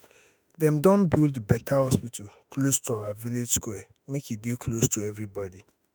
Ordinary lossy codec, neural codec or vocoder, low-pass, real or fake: none; autoencoder, 48 kHz, 32 numbers a frame, DAC-VAE, trained on Japanese speech; none; fake